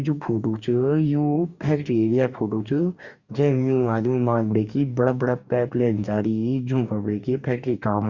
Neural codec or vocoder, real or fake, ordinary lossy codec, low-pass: codec, 44.1 kHz, 2.6 kbps, DAC; fake; Opus, 64 kbps; 7.2 kHz